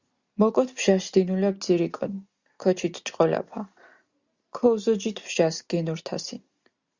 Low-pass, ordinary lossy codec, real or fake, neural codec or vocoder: 7.2 kHz; Opus, 64 kbps; real; none